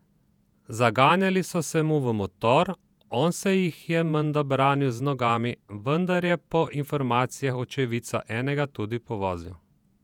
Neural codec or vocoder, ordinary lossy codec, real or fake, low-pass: vocoder, 48 kHz, 128 mel bands, Vocos; none; fake; 19.8 kHz